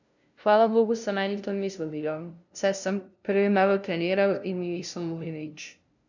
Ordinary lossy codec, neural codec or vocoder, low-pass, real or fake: none; codec, 16 kHz, 0.5 kbps, FunCodec, trained on LibriTTS, 25 frames a second; 7.2 kHz; fake